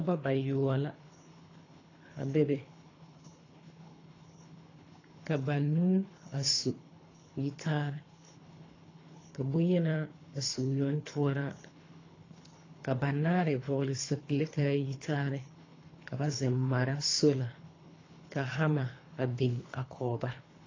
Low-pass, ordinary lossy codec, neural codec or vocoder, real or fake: 7.2 kHz; AAC, 32 kbps; codec, 24 kHz, 3 kbps, HILCodec; fake